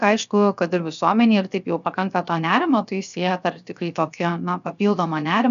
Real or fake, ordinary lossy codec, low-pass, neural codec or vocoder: fake; MP3, 96 kbps; 7.2 kHz; codec, 16 kHz, 0.7 kbps, FocalCodec